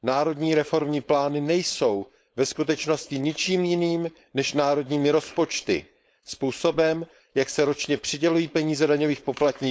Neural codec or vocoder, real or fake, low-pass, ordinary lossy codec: codec, 16 kHz, 4.8 kbps, FACodec; fake; none; none